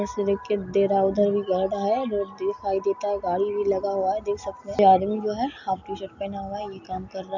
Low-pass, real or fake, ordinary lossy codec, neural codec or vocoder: 7.2 kHz; real; none; none